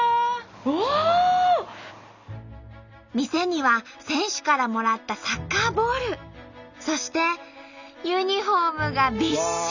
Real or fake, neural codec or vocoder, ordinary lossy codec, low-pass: real; none; none; 7.2 kHz